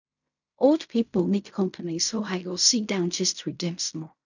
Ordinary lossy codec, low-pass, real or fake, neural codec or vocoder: none; 7.2 kHz; fake; codec, 16 kHz in and 24 kHz out, 0.4 kbps, LongCat-Audio-Codec, fine tuned four codebook decoder